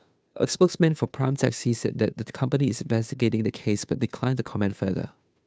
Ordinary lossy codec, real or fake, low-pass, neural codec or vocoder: none; fake; none; codec, 16 kHz, 2 kbps, FunCodec, trained on Chinese and English, 25 frames a second